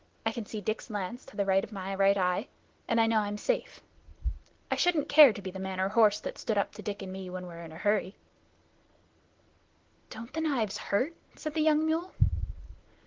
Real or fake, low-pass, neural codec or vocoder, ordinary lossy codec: real; 7.2 kHz; none; Opus, 16 kbps